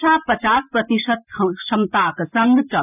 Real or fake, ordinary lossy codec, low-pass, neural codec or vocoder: real; none; 3.6 kHz; none